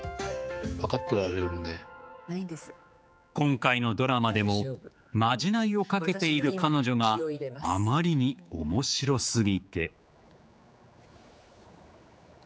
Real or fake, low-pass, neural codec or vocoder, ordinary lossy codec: fake; none; codec, 16 kHz, 4 kbps, X-Codec, HuBERT features, trained on general audio; none